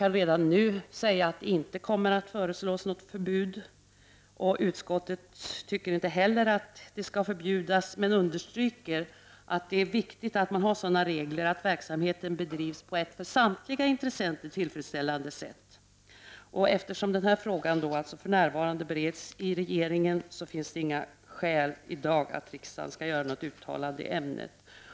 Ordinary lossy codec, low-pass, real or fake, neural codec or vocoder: none; none; real; none